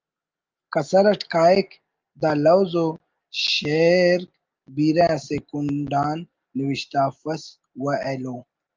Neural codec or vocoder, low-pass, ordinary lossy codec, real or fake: none; 7.2 kHz; Opus, 32 kbps; real